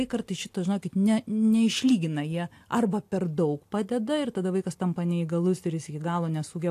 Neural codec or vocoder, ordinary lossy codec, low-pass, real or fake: none; AAC, 64 kbps; 14.4 kHz; real